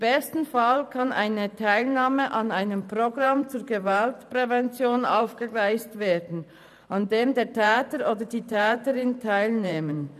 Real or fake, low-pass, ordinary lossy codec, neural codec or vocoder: fake; 14.4 kHz; none; vocoder, 44.1 kHz, 128 mel bands every 512 samples, BigVGAN v2